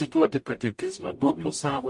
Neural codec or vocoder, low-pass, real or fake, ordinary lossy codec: codec, 44.1 kHz, 0.9 kbps, DAC; 10.8 kHz; fake; MP3, 48 kbps